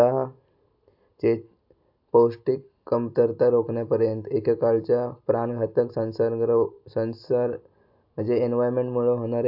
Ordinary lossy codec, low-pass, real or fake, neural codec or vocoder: AAC, 48 kbps; 5.4 kHz; real; none